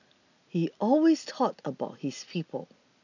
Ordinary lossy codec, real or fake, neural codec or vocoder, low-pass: none; real; none; 7.2 kHz